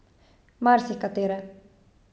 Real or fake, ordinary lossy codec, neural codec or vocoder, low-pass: real; none; none; none